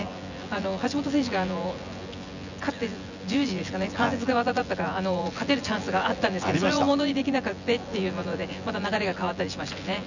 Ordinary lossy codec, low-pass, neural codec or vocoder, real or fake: none; 7.2 kHz; vocoder, 24 kHz, 100 mel bands, Vocos; fake